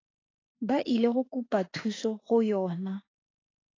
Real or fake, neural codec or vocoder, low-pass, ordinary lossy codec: fake; autoencoder, 48 kHz, 32 numbers a frame, DAC-VAE, trained on Japanese speech; 7.2 kHz; AAC, 32 kbps